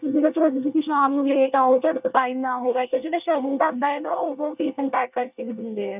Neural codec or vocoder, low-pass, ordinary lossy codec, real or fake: codec, 24 kHz, 1 kbps, SNAC; 3.6 kHz; none; fake